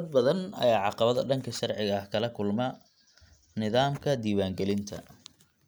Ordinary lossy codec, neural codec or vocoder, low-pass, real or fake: none; none; none; real